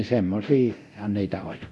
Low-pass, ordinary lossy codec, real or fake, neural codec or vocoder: none; none; fake; codec, 24 kHz, 0.9 kbps, DualCodec